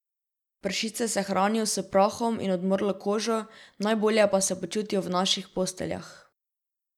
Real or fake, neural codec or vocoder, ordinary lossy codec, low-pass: real; none; none; 19.8 kHz